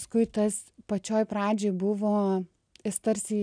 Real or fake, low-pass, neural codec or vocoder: real; 9.9 kHz; none